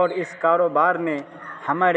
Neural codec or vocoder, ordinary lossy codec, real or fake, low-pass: none; none; real; none